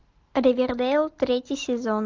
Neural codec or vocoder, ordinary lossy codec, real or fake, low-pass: none; Opus, 32 kbps; real; 7.2 kHz